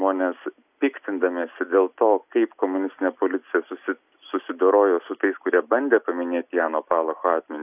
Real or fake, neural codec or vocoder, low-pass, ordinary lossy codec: real; none; 3.6 kHz; AAC, 32 kbps